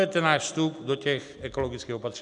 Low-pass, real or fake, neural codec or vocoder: 10.8 kHz; real; none